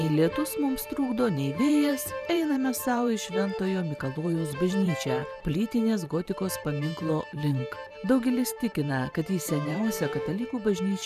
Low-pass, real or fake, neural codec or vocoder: 14.4 kHz; fake; vocoder, 44.1 kHz, 128 mel bands every 512 samples, BigVGAN v2